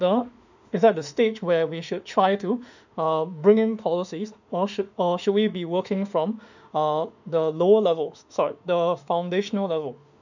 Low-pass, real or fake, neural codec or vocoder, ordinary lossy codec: 7.2 kHz; fake; autoencoder, 48 kHz, 32 numbers a frame, DAC-VAE, trained on Japanese speech; none